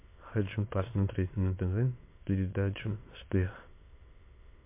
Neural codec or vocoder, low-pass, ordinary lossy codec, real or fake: autoencoder, 22.05 kHz, a latent of 192 numbers a frame, VITS, trained on many speakers; 3.6 kHz; MP3, 24 kbps; fake